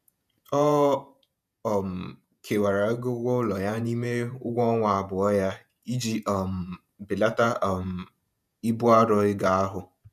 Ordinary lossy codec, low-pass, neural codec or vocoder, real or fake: none; 14.4 kHz; vocoder, 48 kHz, 128 mel bands, Vocos; fake